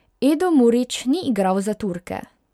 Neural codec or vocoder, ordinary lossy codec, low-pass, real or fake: none; MP3, 96 kbps; 19.8 kHz; real